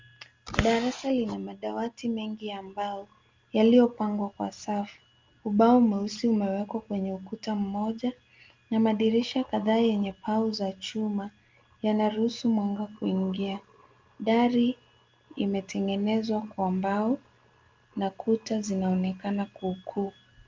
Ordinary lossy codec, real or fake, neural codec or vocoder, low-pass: Opus, 32 kbps; real; none; 7.2 kHz